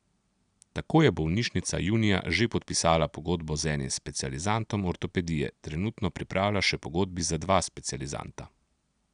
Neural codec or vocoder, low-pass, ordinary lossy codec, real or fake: none; 9.9 kHz; none; real